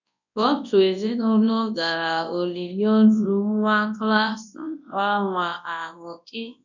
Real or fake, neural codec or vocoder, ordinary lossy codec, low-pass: fake; codec, 24 kHz, 0.9 kbps, WavTokenizer, large speech release; none; 7.2 kHz